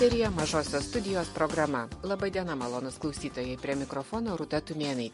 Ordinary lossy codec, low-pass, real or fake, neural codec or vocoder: MP3, 48 kbps; 14.4 kHz; real; none